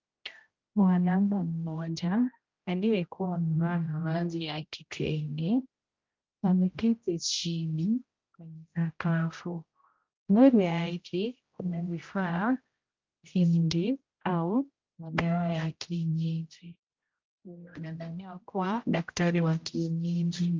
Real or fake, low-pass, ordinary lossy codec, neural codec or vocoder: fake; 7.2 kHz; Opus, 24 kbps; codec, 16 kHz, 0.5 kbps, X-Codec, HuBERT features, trained on general audio